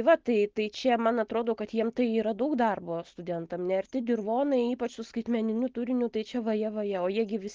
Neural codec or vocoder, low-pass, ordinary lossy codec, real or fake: none; 7.2 kHz; Opus, 16 kbps; real